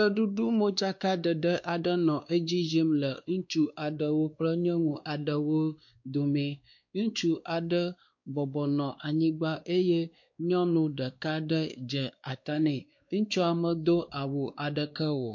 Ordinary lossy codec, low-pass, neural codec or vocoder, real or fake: MP3, 48 kbps; 7.2 kHz; codec, 16 kHz, 2 kbps, X-Codec, WavLM features, trained on Multilingual LibriSpeech; fake